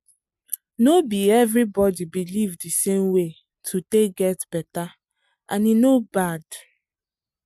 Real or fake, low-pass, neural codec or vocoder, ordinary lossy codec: real; 14.4 kHz; none; MP3, 96 kbps